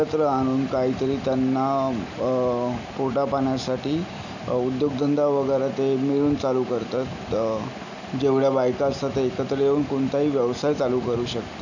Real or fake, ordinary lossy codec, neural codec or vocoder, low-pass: real; none; none; 7.2 kHz